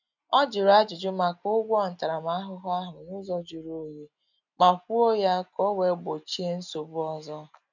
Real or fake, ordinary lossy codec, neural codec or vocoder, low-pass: real; none; none; 7.2 kHz